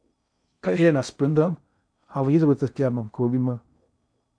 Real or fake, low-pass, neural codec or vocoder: fake; 9.9 kHz; codec, 16 kHz in and 24 kHz out, 0.6 kbps, FocalCodec, streaming, 2048 codes